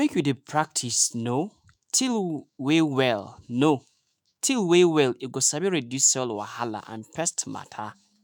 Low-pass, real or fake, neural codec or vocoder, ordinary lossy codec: none; fake; autoencoder, 48 kHz, 128 numbers a frame, DAC-VAE, trained on Japanese speech; none